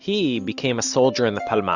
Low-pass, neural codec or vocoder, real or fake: 7.2 kHz; none; real